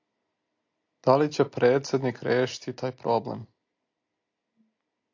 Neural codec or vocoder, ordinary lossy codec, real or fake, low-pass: none; AAC, 48 kbps; real; 7.2 kHz